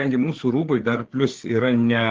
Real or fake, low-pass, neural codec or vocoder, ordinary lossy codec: fake; 7.2 kHz; codec, 16 kHz, 4 kbps, FreqCodec, larger model; Opus, 16 kbps